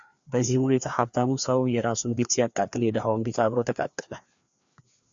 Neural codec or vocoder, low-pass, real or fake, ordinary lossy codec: codec, 16 kHz, 2 kbps, FreqCodec, larger model; 7.2 kHz; fake; Opus, 64 kbps